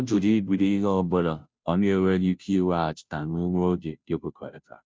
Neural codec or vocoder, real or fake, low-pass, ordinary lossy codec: codec, 16 kHz, 0.5 kbps, FunCodec, trained on Chinese and English, 25 frames a second; fake; none; none